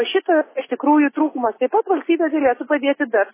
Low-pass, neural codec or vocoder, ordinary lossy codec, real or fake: 3.6 kHz; none; MP3, 16 kbps; real